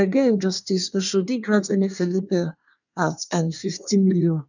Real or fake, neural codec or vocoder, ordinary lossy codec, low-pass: fake; codec, 24 kHz, 1 kbps, SNAC; none; 7.2 kHz